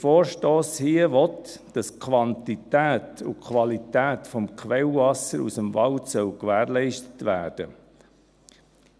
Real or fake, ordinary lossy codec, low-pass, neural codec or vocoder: real; none; none; none